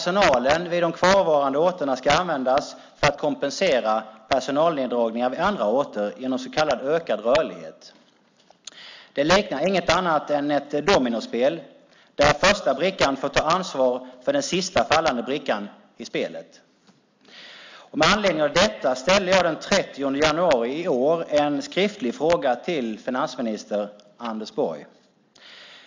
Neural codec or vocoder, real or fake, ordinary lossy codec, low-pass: none; real; MP3, 64 kbps; 7.2 kHz